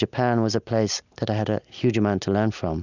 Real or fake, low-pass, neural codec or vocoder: real; 7.2 kHz; none